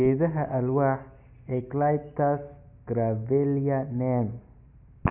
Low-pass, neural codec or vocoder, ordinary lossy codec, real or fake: 3.6 kHz; none; none; real